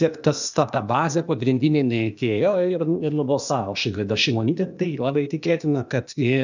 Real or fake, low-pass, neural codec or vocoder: fake; 7.2 kHz; codec, 16 kHz, 0.8 kbps, ZipCodec